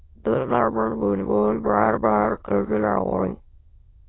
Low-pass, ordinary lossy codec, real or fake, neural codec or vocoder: 7.2 kHz; AAC, 16 kbps; fake; autoencoder, 22.05 kHz, a latent of 192 numbers a frame, VITS, trained on many speakers